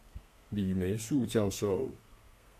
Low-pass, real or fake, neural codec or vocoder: 14.4 kHz; fake; codec, 32 kHz, 1.9 kbps, SNAC